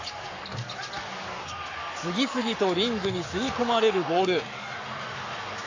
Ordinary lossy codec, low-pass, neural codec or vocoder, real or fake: AAC, 48 kbps; 7.2 kHz; codec, 44.1 kHz, 7.8 kbps, Pupu-Codec; fake